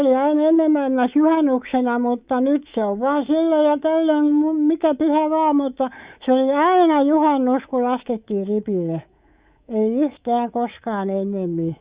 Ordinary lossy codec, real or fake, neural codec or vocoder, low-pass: Opus, 64 kbps; fake; codec, 24 kHz, 3.1 kbps, DualCodec; 3.6 kHz